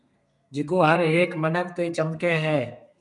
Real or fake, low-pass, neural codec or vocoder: fake; 10.8 kHz; codec, 32 kHz, 1.9 kbps, SNAC